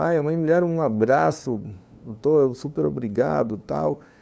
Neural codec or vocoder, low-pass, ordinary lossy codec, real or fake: codec, 16 kHz, 2 kbps, FunCodec, trained on LibriTTS, 25 frames a second; none; none; fake